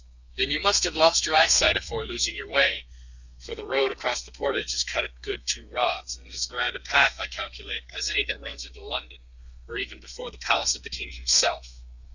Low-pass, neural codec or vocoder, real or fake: 7.2 kHz; codec, 32 kHz, 1.9 kbps, SNAC; fake